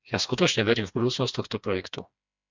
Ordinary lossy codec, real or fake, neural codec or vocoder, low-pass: MP3, 64 kbps; fake; codec, 16 kHz, 2 kbps, FreqCodec, smaller model; 7.2 kHz